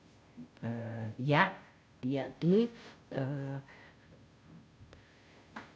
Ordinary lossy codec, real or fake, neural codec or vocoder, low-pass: none; fake; codec, 16 kHz, 0.5 kbps, FunCodec, trained on Chinese and English, 25 frames a second; none